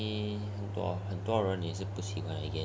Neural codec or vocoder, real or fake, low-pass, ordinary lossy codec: none; real; none; none